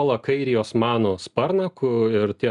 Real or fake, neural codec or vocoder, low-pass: real; none; 10.8 kHz